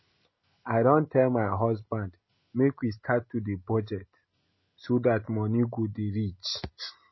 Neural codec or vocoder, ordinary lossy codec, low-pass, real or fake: none; MP3, 24 kbps; 7.2 kHz; real